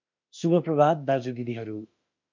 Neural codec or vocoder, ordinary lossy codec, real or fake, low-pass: autoencoder, 48 kHz, 32 numbers a frame, DAC-VAE, trained on Japanese speech; MP3, 64 kbps; fake; 7.2 kHz